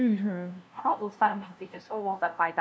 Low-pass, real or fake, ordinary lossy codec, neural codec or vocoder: none; fake; none; codec, 16 kHz, 0.5 kbps, FunCodec, trained on LibriTTS, 25 frames a second